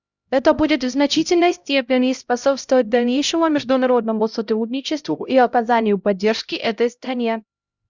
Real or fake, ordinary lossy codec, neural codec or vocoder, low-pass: fake; Opus, 64 kbps; codec, 16 kHz, 0.5 kbps, X-Codec, HuBERT features, trained on LibriSpeech; 7.2 kHz